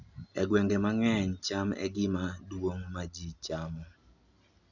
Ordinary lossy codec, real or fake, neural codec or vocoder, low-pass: none; real; none; 7.2 kHz